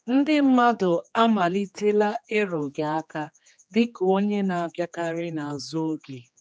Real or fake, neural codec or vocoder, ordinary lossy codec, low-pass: fake; codec, 16 kHz, 2 kbps, X-Codec, HuBERT features, trained on general audio; none; none